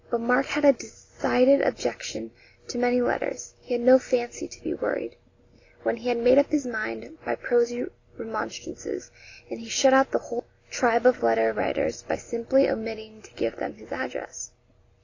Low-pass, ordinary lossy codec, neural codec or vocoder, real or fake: 7.2 kHz; AAC, 32 kbps; none; real